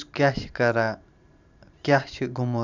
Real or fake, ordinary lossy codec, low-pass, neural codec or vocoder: fake; none; 7.2 kHz; vocoder, 44.1 kHz, 128 mel bands every 512 samples, BigVGAN v2